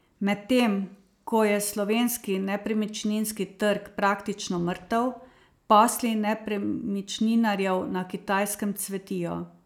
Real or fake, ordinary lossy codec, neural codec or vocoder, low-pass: real; none; none; 19.8 kHz